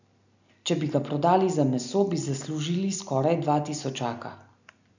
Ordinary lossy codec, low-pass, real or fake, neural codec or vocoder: none; 7.2 kHz; real; none